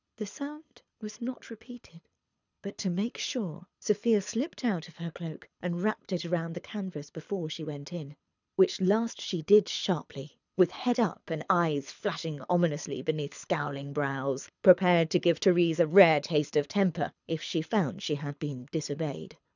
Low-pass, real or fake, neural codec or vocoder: 7.2 kHz; fake; codec, 24 kHz, 6 kbps, HILCodec